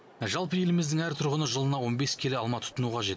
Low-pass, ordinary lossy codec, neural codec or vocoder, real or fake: none; none; none; real